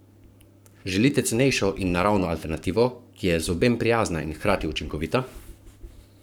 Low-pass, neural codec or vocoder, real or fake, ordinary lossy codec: none; codec, 44.1 kHz, 7.8 kbps, Pupu-Codec; fake; none